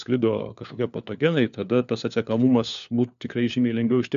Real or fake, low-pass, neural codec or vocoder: fake; 7.2 kHz; codec, 16 kHz, 4 kbps, FunCodec, trained on LibriTTS, 50 frames a second